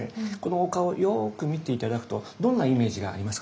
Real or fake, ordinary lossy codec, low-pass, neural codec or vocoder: real; none; none; none